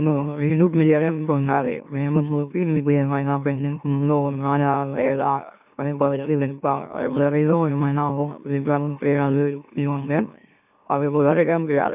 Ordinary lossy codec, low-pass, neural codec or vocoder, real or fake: none; 3.6 kHz; autoencoder, 44.1 kHz, a latent of 192 numbers a frame, MeloTTS; fake